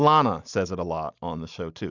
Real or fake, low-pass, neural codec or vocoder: real; 7.2 kHz; none